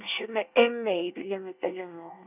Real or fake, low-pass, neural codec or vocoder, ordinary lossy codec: fake; 3.6 kHz; codec, 24 kHz, 1 kbps, SNAC; none